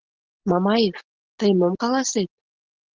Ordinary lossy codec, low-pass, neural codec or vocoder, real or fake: Opus, 16 kbps; 7.2 kHz; none; real